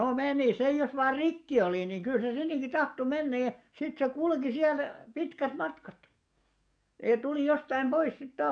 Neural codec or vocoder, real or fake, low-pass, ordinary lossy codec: codec, 44.1 kHz, 7.8 kbps, DAC; fake; 9.9 kHz; none